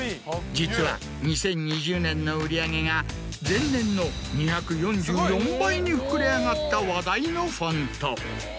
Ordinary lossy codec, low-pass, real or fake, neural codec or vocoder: none; none; real; none